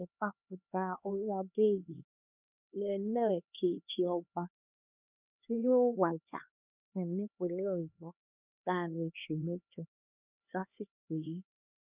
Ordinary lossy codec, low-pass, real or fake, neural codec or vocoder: none; 3.6 kHz; fake; codec, 16 kHz, 2 kbps, X-Codec, HuBERT features, trained on LibriSpeech